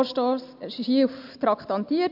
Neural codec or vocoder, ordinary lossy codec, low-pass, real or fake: none; MP3, 48 kbps; 5.4 kHz; real